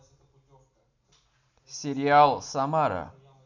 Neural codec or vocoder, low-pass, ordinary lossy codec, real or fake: none; 7.2 kHz; none; real